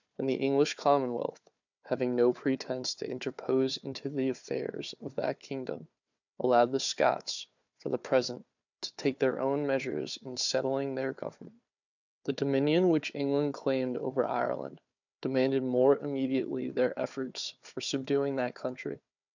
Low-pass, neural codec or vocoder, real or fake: 7.2 kHz; codec, 16 kHz, 4 kbps, FunCodec, trained on Chinese and English, 50 frames a second; fake